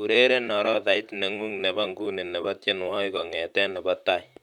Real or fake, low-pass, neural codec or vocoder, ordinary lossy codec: fake; 19.8 kHz; vocoder, 44.1 kHz, 128 mel bands, Pupu-Vocoder; none